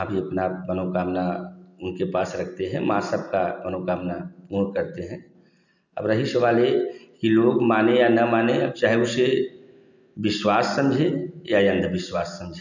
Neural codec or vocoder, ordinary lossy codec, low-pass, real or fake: none; none; 7.2 kHz; real